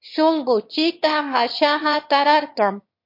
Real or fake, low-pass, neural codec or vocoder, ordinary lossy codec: fake; 5.4 kHz; autoencoder, 22.05 kHz, a latent of 192 numbers a frame, VITS, trained on one speaker; MP3, 32 kbps